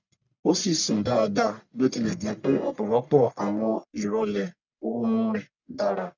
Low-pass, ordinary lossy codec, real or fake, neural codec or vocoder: 7.2 kHz; none; fake; codec, 44.1 kHz, 1.7 kbps, Pupu-Codec